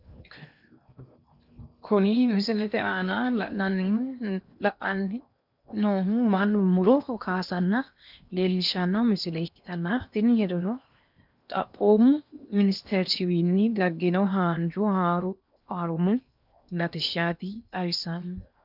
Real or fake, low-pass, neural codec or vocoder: fake; 5.4 kHz; codec, 16 kHz in and 24 kHz out, 0.8 kbps, FocalCodec, streaming, 65536 codes